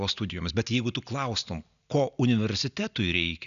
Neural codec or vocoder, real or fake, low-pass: none; real; 7.2 kHz